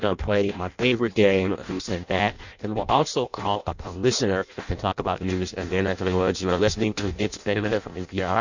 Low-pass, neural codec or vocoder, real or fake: 7.2 kHz; codec, 16 kHz in and 24 kHz out, 0.6 kbps, FireRedTTS-2 codec; fake